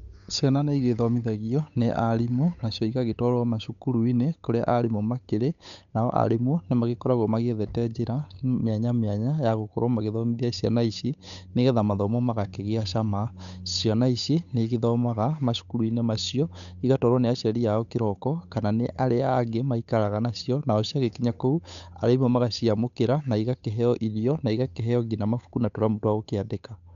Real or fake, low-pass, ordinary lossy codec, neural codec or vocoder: fake; 7.2 kHz; none; codec, 16 kHz, 8 kbps, FunCodec, trained on Chinese and English, 25 frames a second